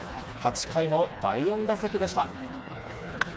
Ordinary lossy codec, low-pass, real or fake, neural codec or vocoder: none; none; fake; codec, 16 kHz, 2 kbps, FreqCodec, smaller model